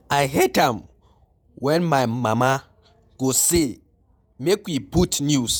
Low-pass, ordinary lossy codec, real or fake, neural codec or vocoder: none; none; fake; vocoder, 48 kHz, 128 mel bands, Vocos